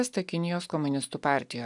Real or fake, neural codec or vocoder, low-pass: real; none; 10.8 kHz